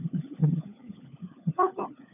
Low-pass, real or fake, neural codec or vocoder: 3.6 kHz; fake; codec, 16 kHz, 4 kbps, FunCodec, trained on LibriTTS, 50 frames a second